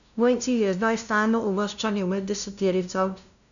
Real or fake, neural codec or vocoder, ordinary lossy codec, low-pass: fake; codec, 16 kHz, 0.5 kbps, FunCodec, trained on LibriTTS, 25 frames a second; none; 7.2 kHz